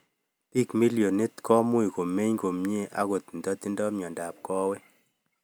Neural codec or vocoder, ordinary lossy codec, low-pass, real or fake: none; none; none; real